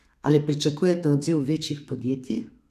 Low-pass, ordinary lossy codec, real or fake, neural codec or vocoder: 14.4 kHz; none; fake; codec, 32 kHz, 1.9 kbps, SNAC